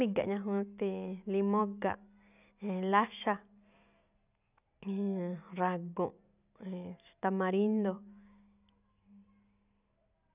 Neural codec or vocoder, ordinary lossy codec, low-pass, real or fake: none; none; 3.6 kHz; real